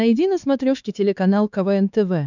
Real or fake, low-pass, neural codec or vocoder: fake; 7.2 kHz; codec, 16 kHz, 4 kbps, X-Codec, HuBERT features, trained on LibriSpeech